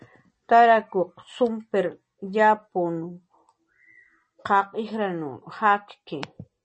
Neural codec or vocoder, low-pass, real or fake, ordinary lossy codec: none; 10.8 kHz; real; MP3, 32 kbps